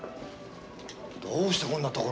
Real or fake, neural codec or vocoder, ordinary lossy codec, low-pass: real; none; none; none